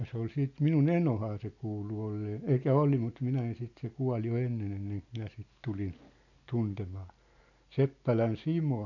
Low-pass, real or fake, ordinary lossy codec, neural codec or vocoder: 7.2 kHz; real; none; none